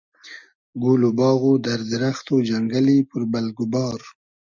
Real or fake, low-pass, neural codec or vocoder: real; 7.2 kHz; none